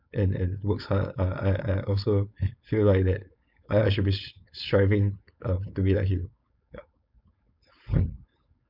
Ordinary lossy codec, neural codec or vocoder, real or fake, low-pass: none; codec, 16 kHz, 4.8 kbps, FACodec; fake; 5.4 kHz